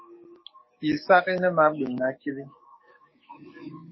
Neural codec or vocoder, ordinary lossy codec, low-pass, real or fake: codec, 44.1 kHz, 7.8 kbps, DAC; MP3, 24 kbps; 7.2 kHz; fake